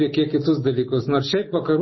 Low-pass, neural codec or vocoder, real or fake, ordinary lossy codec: 7.2 kHz; none; real; MP3, 24 kbps